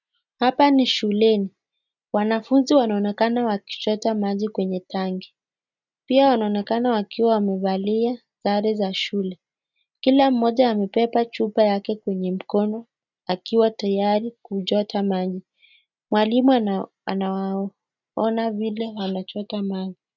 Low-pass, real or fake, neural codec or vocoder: 7.2 kHz; real; none